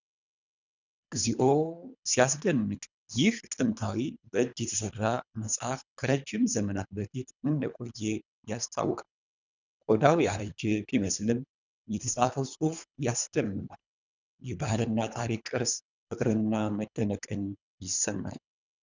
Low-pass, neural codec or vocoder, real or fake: 7.2 kHz; codec, 24 kHz, 3 kbps, HILCodec; fake